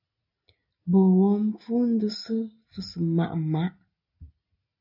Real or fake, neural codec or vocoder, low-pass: real; none; 5.4 kHz